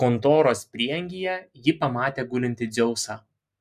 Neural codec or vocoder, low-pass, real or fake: none; 14.4 kHz; real